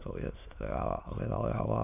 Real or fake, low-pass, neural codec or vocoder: fake; 3.6 kHz; autoencoder, 22.05 kHz, a latent of 192 numbers a frame, VITS, trained on many speakers